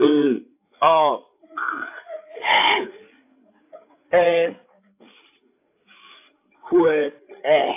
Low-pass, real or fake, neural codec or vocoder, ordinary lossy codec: 3.6 kHz; fake; codec, 16 kHz, 8 kbps, FreqCodec, larger model; AAC, 24 kbps